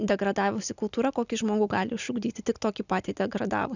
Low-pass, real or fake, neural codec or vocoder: 7.2 kHz; real; none